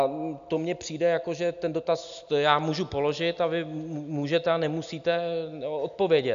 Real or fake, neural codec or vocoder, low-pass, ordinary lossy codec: real; none; 7.2 kHz; AAC, 96 kbps